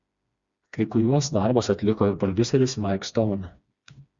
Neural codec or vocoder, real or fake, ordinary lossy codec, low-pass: codec, 16 kHz, 2 kbps, FreqCodec, smaller model; fake; Opus, 64 kbps; 7.2 kHz